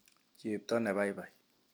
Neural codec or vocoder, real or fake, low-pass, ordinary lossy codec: none; real; none; none